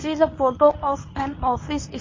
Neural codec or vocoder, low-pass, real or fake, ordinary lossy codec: codec, 24 kHz, 0.9 kbps, WavTokenizer, medium speech release version 1; 7.2 kHz; fake; MP3, 32 kbps